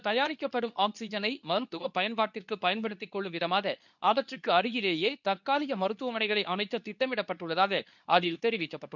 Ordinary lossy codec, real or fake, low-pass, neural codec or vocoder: none; fake; 7.2 kHz; codec, 24 kHz, 0.9 kbps, WavTokenizer, medium speech release version 2